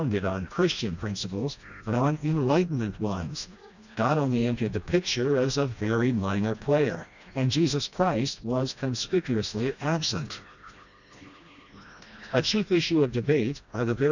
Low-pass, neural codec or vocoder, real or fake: 7.2 kHz; codec, 16 kHz, 1 kbps, FreqCodec, smaller model; fake